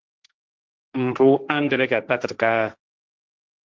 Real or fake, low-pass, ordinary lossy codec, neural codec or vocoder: fake; 7.2 kHz; Opus, 32 kbps; codec, 16 kHz, 1.1 kbps, Voila-Tokenizer